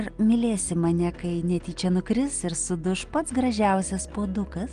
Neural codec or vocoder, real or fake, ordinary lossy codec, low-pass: none; real; Opus, 24 kbps; 9.9 kHz